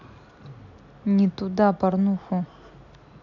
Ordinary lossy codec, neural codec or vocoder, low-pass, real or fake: none; none; 7.2 kHz; real